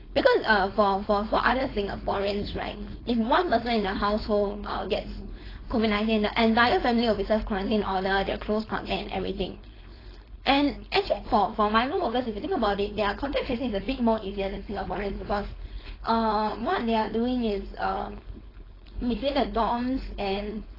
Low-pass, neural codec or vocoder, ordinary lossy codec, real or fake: 5.4 kHz; codec, 16 kHz, 4.8 kbps, FACodec; AAC, 24 kbps; fake